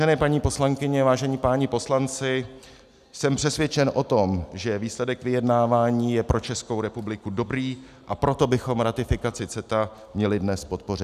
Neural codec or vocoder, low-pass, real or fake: none; 14.4 kHz; real